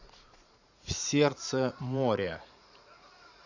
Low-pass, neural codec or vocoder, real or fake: 7.2 kHz; vocoder, 44.1 kHz, 80 mel bands, Vocos; fake